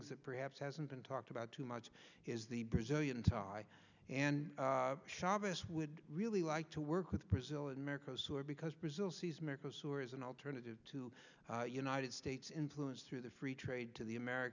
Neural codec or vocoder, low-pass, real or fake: none; 7.2 kHz; real